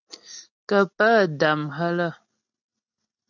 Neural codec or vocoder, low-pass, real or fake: none; 7.2 kHz; real